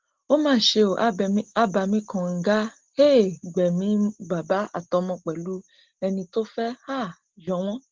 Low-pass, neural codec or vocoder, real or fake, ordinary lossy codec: 7.2 kHz; none; real; Opus, 16 kbps